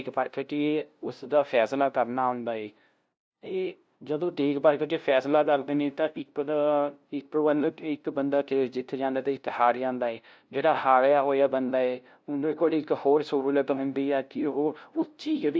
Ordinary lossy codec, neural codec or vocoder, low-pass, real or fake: none; codec, 16 kHz, 0.5 kbps, FunCodec, trained on LibriTTS, 25 frames a second; none; fake